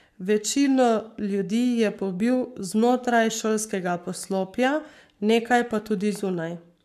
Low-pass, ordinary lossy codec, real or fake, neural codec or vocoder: 14.4 kHz; none; fake; codec, 44.1 kHz, 7.8 kbps, Pupu-Codec